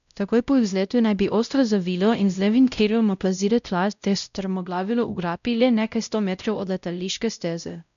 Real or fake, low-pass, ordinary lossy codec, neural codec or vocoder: fake; 7.2 kHz; none; codec, 16 kHz, 0.5 kbps, X-Codec, WavLM features, trained on Multilingual LibriSpeech